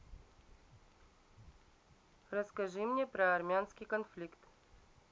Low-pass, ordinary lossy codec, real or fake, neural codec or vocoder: none; none; real; none